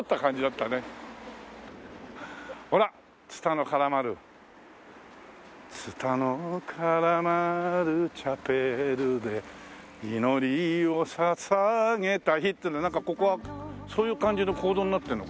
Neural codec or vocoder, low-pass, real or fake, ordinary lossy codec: none; none; real; none